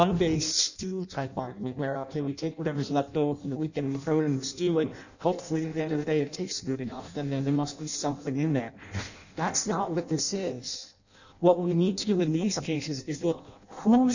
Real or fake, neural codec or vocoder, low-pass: fake; codec, 16 kHz in and 24 kHz out, 0.6 kbps, FireRedTTS-2 codec; 7.2 kHz